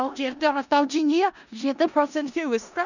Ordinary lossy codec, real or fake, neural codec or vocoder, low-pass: none; fake; codec, 16 kHz in and 24 kHz out, 0.4 kbps, LongCat-Audio-Codec, four codebook decoder; 7.2 kHz